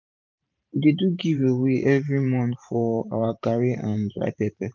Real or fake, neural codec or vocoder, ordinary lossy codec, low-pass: fake; vocoder, 44.1 kHz, 128 mel bands every 512 samples, BigVGAN v2; none; 7.2 kHz